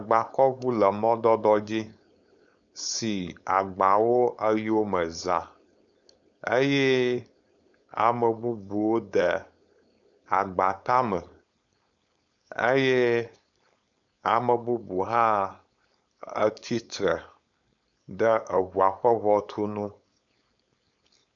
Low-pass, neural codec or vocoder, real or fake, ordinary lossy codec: 7.2 kHz; codec, 16 kHz, 4.8 kbps, FACodec; fake; MP3, 96 kbps